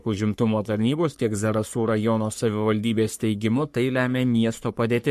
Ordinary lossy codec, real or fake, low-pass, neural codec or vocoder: MP3, 64 kbps; fake; 14.4 kHz; codec, 44.1 kHz, 3.4 kbps, Pupu-Codec